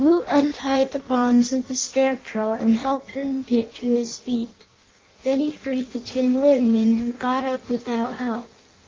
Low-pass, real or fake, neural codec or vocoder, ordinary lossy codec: 7.2 kHz; fake; codec, 16 kHz in and 24 kHz out, 0.6 kbps, FireRedTTS-2 codec; Opus, 24 kbps